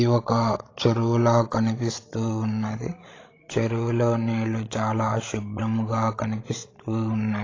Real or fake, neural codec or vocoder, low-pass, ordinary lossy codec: fake; codec, 16 kHz, 16 kbps, FreqCodec, larger model; 7.2 kHz; AAC, 32 kbps